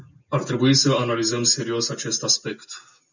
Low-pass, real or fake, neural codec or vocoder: 7.2 kHz; real; none